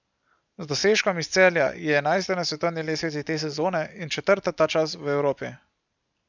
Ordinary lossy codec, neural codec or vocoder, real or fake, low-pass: none; none; real; 7.2 kHz